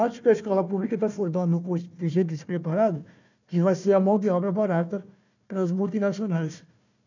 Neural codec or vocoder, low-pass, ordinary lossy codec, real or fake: codec, 16 kHz, 1 kbps, FunCodec, trained on Chinese and English, 50 frames a second; 7.2 kHz; none; fake